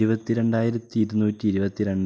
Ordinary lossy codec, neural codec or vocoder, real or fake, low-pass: none; none; real; none